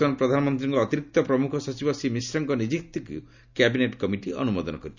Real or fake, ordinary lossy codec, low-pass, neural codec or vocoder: real; none; 7.2 kHz; none